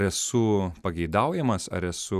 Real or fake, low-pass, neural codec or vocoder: real; 14.4 kHz; none